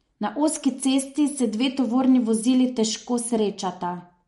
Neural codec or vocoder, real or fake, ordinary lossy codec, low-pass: none; real; MP3, 48 kbps; 19.8 kHz